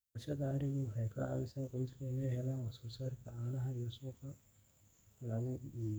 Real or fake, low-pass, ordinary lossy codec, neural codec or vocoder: fake; none; none; codec, 44.1 kHz, 2.6 kbps, SNAC